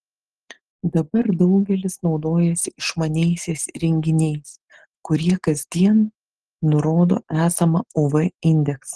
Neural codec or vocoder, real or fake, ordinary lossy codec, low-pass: none; real; Opus, 16 kbps; 10.8 kHz